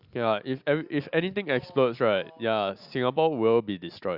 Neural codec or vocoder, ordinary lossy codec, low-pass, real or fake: none; Opus, 64 kbps; 5.4 kHz; real